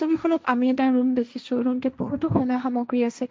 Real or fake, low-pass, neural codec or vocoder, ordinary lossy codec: fake; none; codec, 16 kHz, 1.1 kbps, Voila-Tokenizer; none